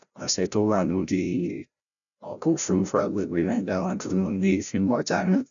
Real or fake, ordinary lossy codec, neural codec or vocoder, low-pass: fake; none; codec, 16 kHz, 0.5 kbps, FreqCodec, larger model; 7.2 kHz